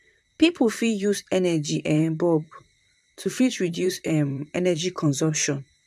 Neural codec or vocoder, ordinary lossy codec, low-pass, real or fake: vocoder, 44.1 kHz, 128 mel bands, Pupu-Vocoder; none; 14.4 kHz; fake